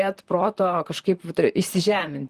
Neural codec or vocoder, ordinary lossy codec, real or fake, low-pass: vocoder, 44.1 kHz, 128 mel bands, Pupu-Vocoder; Opus, 24 kbps; fake; 14.4 kHz